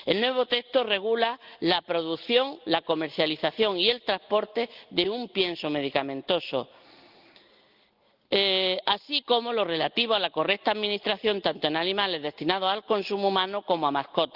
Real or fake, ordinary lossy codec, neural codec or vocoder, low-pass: real; Opus, 32 kbps; none; 5.4 kHz